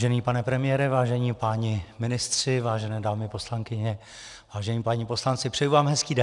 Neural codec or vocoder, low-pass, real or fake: none; 10.8 kHz; real